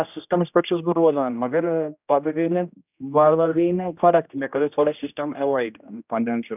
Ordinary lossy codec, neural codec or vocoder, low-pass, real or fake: none; codec, 16 kHz, 1 kbps, X-Codec, HuBERT features, trained on general audio; 3.6 kHz; fake